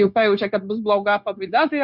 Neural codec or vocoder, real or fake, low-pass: codec, 16 kHz, 0.9 kbps, LongCat-Audio-Codec; fake; 5.4 kHz